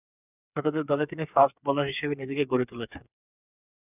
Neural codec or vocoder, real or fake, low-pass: codec, 16 kHz, 4 kbps, FreqCodec, smaller model; fake; 3.6 kHz